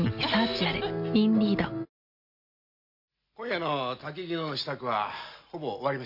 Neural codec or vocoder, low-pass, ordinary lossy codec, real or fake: none; 5.4 kHz; none; real